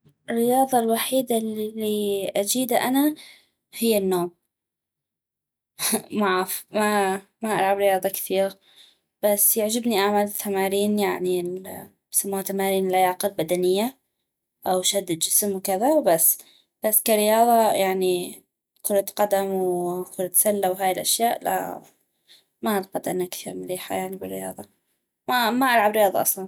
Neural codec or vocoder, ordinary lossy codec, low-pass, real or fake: none; none; none; real